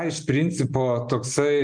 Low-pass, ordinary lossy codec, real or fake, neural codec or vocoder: 9.9 kHz; Opus, 32 kbps; real; none